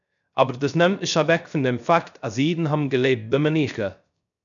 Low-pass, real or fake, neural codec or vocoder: 7.2 kHz; fake; codec, 16 kHz, 0.3 kbps, FocalCodec